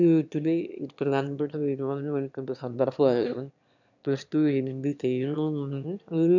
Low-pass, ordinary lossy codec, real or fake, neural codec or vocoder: 7.2 kHz; none; fake; autoencoder, 22.05 kHz, a latent of 192 numbers a frame, VITS, trained on one speaker